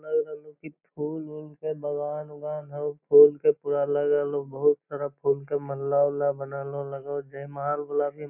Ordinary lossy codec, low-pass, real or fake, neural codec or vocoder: none; 3.6 kHz; real; none